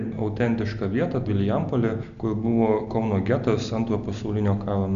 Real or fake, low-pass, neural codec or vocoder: real; 7.2 kHz; none